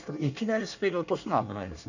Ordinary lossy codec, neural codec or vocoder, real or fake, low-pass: none; codec, 24 kHz, 1 kbps, SNAC; fake; 7.2 kHz